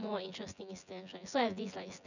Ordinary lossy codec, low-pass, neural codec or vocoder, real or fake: none; 7.2 kHz; vocoder, 24 kHz, 100 mel bands, Vocos; fake